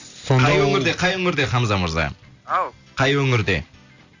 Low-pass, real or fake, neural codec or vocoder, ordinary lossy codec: 7.2 kHz; real; none; AAC, 48 kbps